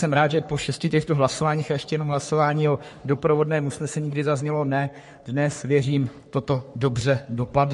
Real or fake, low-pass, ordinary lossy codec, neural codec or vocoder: fake; 14.4 kHz; MP3, 48 kbps; codec, 44.1 kHz, 3.4 kbps, Pupu-Codec